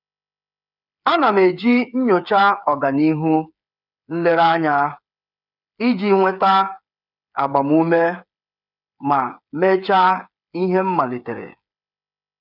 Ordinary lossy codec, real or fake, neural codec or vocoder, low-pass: none; fake; codec, 16 kHz, 8 kbps, FreqCodec, smaller model; 5.4 kHz